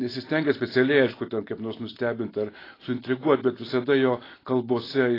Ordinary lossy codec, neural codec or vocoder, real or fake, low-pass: AAC, 24 kbps; none; real; 5.4 kHz